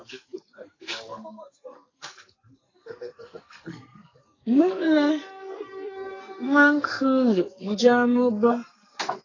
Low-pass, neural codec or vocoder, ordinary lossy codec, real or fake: 7.2 kHz; codec, 44.1 kHz, 2.6 kbps, SNAC; AAC, 32 kbps; fake